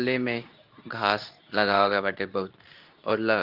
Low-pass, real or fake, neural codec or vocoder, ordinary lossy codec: 5.4 kHz; fake; codec, 24 kHz, 0.9 kbps, WavTokenizer, medium speech release version 2; Opus, 32 kbps